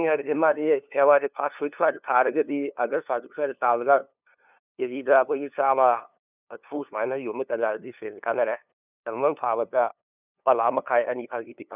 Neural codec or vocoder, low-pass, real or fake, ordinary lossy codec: codec, 16 kHz, 2 kbps, FunCodec, trained on LibriTTS, 25 frames a second; 3.6 kHz; fake; none